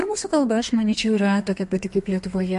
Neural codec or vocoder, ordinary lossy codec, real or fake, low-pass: codec, 32 kHz, 1.9 kbps, SNAC; MP3, 48 kbps; fake; 14.4 kHz